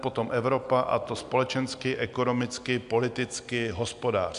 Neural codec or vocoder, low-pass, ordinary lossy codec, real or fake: none; 10.8 kHz; MP3, 96 kbps; real